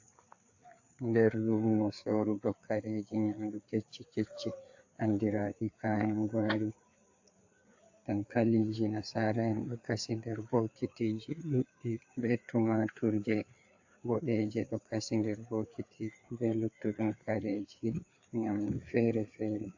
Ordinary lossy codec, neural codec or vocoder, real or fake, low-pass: AAC, 48 kbps; codec, 16 kHz, 4 kbps, FreqCodec, larger model; fake; 7.2 kHz